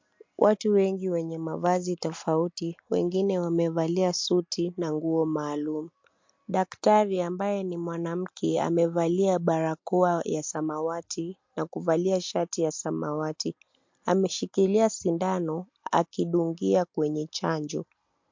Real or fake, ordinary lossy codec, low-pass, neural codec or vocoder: real; MP3, 48 kbps; 7.2 kHz; none